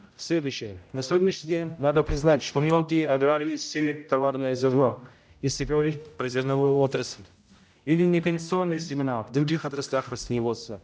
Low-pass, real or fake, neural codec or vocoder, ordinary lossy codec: none; fake; codec, 16 kHz, 0.5 kbps, X-Codec, HuBERT features, trained on general audio; none